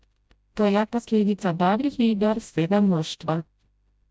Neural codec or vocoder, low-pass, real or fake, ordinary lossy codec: codec, 16 kHz, 0.5 kbps, FreqCodec, smaller model; none; fake; none